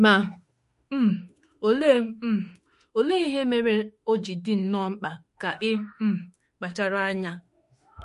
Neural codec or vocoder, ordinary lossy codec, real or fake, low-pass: autoencoder, 48 kHz, 32 numbers a frame, DAC-VAE, trained on Japanese speech; MP3, 48 kbps; fake; 14.4 kHz